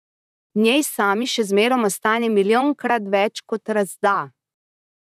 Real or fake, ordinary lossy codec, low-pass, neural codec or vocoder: fake; none; 14.4 kHz; vocoder, 44.1 kHz, 128 mel bands, Pupu-Vocoder